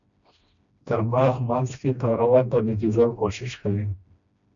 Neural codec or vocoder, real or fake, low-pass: codec, 16 kHz, 1 kbps, FreqCodec, smaller model; fake; 7.2 kHz